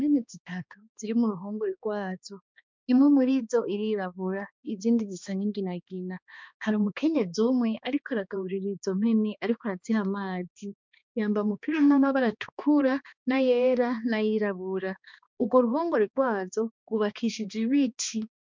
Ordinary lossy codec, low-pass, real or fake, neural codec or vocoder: MP3, 64 kbps; 7.2 kHz; fake; codec, 16 kHz, 2 kbps, X-Codec, HuBERT features, trained on balanced general audio